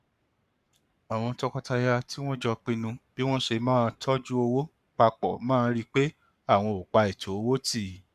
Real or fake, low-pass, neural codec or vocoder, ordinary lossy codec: fake; 14.4 kHz; codec, 44.1 kHz, 7.8 kbps, Pupu-Codec; none